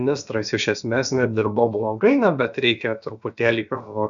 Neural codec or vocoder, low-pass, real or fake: codec, 16 kHz, about 1 kbps, DyCAST, with the encoder's durations; 7.2 kHz; fake